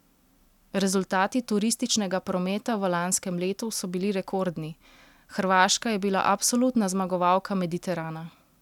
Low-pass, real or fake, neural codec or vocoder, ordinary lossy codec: 19.8 kHz; real; none; none